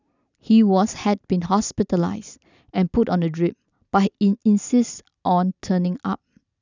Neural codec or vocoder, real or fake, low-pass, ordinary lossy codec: none; real; 7.2 kHz; none